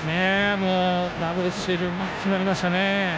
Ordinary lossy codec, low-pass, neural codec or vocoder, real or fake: none; none; codec, 16 kHz, 0.5 kbps, FunCodec, trained on Chinese and English, 25 frames a second; fake